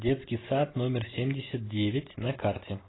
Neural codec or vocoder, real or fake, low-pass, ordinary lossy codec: none; real; 7.2 kHz; AAC, 16 kbps